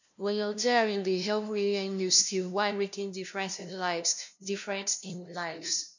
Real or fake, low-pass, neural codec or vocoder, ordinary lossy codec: fake; 7.2 kHz; codec, 16 kHz, 0.5 kbps, FunCodec, trained on LibriTTS, 25 frames a second; none